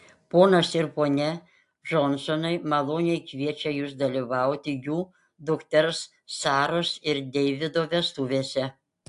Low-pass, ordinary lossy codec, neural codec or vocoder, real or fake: 10.8 kHz; AAC, 64 kbps; none; real